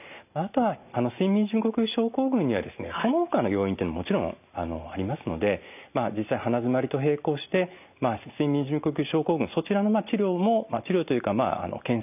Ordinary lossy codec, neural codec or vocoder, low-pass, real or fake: none; none; 3.6 kHz; real